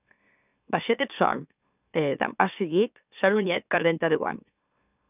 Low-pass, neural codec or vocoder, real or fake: 3.6 kHz; autoencoder, 44.1 kHz, a latent of 192 numbers a frame, MeloTTS; fake